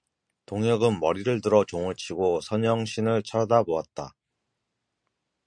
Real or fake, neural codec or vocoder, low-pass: real; none; 9.9 kHz